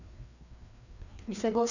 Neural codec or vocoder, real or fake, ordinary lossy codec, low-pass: codec, 16 kHz, 2 kbps, FreqCodec, larger model; fake; none; 7.2 kHz